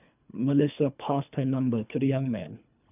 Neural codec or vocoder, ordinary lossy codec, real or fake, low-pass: codec, 24 kHz, 3 kbps, HILCodec; none; fake; 3.6 kHz